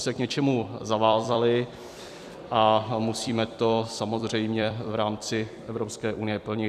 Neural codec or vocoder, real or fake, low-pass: vocoder, 44.1 kHz, 128 mel bands every 256 samples, BigVGAN v2; fake; 14.4 kHz